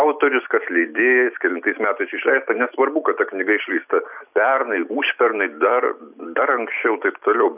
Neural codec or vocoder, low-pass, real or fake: none; 3.6 kHz; real